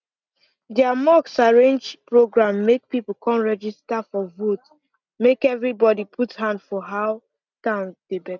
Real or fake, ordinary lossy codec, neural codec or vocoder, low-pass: real; none; none; 7.2 kHz